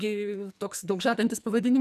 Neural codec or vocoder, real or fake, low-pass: codec, 44.1 kHz, 2.6 kbps, SNAC; fake; 14.4 kHz